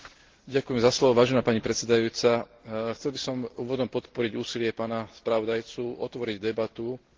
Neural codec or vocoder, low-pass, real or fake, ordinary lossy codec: none; 7.2 kHz; real; Opus, 16 kbps